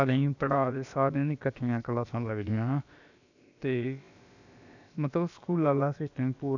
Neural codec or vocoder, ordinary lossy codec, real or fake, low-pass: codec, 16 kHz, about 1 kbps, DyCAST, with the encoder's durations; none; fake; 7.2 kHz